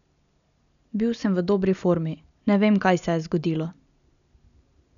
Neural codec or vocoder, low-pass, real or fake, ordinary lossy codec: none; 7.2 kHz; real; none